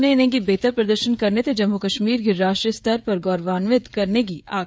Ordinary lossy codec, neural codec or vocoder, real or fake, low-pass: none; codec, 16 kHz, 16 kbps, FreqCodec, smaller model; fake; none